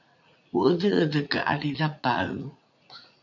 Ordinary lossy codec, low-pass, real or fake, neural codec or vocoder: MP3, 64 kbps; 7.2 kHz; fake; vocoder, 44.1 kHz, 80 mel bands, Vocos